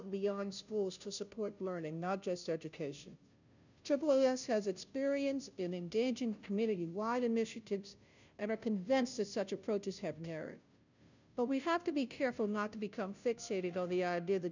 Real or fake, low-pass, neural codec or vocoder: fake; 7.2 kHz; codec, 16 kHz, 0.5 kbps, FunCodec, trained on Chinese and English, 25 frames a second